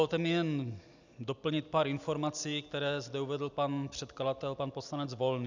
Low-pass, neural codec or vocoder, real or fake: 7.2 kHz; none; real